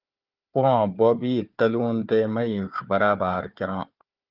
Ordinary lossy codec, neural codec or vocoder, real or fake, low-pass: Opus, 32 kbps; codec, 16 kHz, 4 kbps, FunCodec, trained on Chinese and English, 50 frames a second; fake; 5.4 kHz